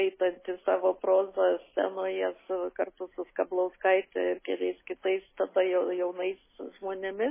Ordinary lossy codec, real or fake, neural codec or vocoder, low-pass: MP3, 16 kbps; real; none; 3.6 kHz